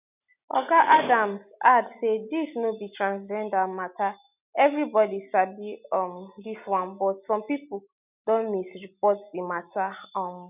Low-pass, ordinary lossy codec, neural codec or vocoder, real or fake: 3.6 kHz; none; none; real